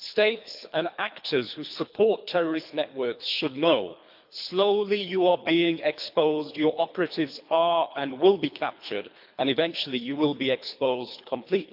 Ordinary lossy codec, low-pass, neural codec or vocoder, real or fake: MP3, 48 kbps; 5.4 kHz; codec, 24 kHz, 3 kbps, HILCodec; fake